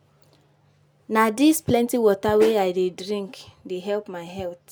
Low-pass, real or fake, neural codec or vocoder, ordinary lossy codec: none; real; none; none